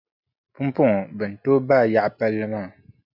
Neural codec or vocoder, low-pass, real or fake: none; 5.4 kHz; real